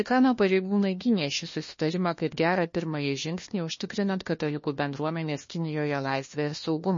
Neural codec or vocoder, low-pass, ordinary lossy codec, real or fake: codec, 16 kHz, 1 kbps, FunCodec, trained on LibriTTS, 50 frames a second; 7.2 kHz; MP3, 32 kbps; fake